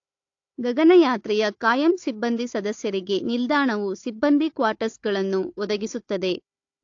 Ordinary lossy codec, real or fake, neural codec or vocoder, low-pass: AAC, 48 kbps; fake; codec, 16 kHz, 4 kbps, FunCodec, trained on Chinese and English, 50 frames a second; 7.2 kHz